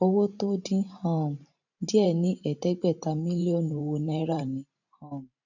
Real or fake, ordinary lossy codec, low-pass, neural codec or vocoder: fake; none; 7.2 kHz; vocoder, 44.1 kHz, 128 mel bands every 512 samples, BigVGAN v2